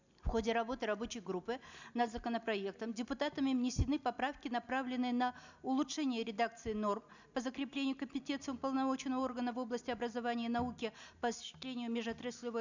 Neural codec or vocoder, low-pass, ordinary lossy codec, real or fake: none; 7.2 kHz; none; real